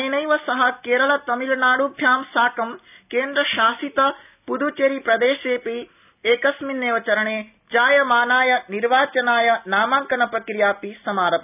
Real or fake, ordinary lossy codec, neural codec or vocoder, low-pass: real; none; none; 3.6 kHz